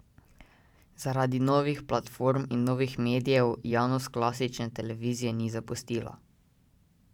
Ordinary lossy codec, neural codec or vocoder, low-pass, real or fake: none; vocoder, 44.1 kHz, 128 mel bands every 512 samples, BigVGAN v2; 19.8 kHz; fake